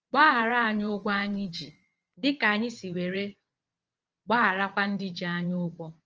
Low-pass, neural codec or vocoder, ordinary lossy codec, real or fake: 7.2 kHz; vocoder, 22.05 kHz, 80 mel bands, WaveNeXt; Opus, 24 kbps; fake